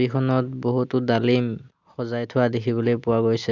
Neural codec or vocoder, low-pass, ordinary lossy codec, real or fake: none; 7.2 kHz; none; real